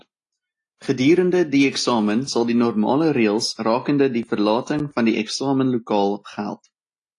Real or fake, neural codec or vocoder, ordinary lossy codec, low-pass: real; none; AAC, 48 kbps; 10.8 kHz